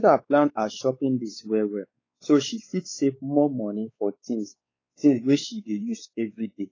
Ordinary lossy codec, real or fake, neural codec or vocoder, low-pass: AAC, 32 kbps; fake; codec, 16 kHz, 4 kbps, X-Codec, WavLM features, trained on Multilingual LibriSpeech; 7.2 kHz